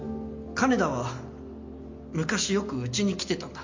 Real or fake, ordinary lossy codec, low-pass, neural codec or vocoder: real; MP3, 48 kbps; 7.2 kHz; none